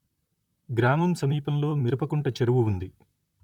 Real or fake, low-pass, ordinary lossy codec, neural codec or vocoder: fake; 19.8 kHz; none; vocoder, 44.1 kHz, 128 mel bands, Pupu-Vocoder